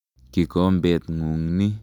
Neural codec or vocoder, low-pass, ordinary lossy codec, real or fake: none; 19.8 kHz; none; real